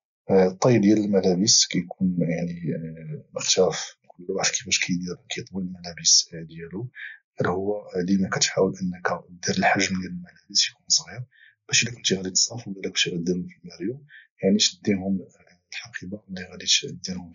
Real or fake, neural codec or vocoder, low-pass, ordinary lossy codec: real; none; 7.2 kHz; none